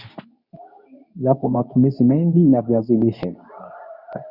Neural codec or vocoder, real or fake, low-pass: codec, 24 kHz, 0.9 kbps, WavTokenizer, medium speech release version 2; fake; 5.4 kHz